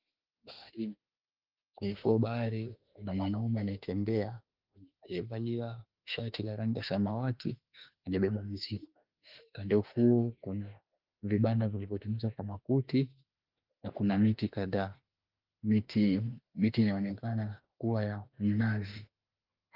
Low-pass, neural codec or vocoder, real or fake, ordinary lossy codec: 5.4 kHz; autoencoder, 48 kHz, 32 numbers a frame, DAC-VAE, trained on Japanese speech; fake; Opus, 32 kbps